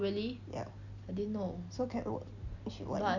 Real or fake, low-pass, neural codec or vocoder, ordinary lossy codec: real; 7.2 kHz; none; none